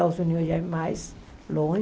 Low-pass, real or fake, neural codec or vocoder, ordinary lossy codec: none; real; none; none